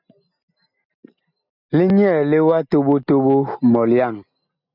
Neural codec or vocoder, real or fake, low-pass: none; real; 5.4 kHz